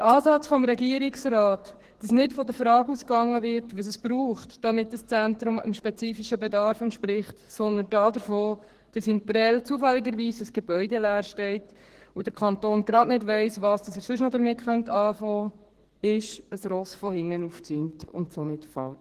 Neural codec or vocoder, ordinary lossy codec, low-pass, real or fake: codec, 44.1 kHz, 2.6 kbps, SNAC; Opus, 16 kbps; 14.4 kHz; fake